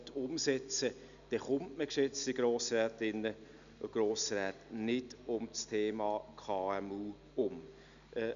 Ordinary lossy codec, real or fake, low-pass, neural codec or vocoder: none; real; 7.2 kHz; none